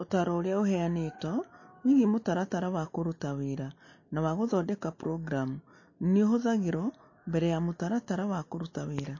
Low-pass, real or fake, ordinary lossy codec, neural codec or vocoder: 7.2 kHz; real; MP3, 32 kbps; none